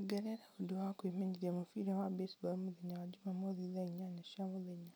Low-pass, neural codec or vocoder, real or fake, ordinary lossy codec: none; none; real; none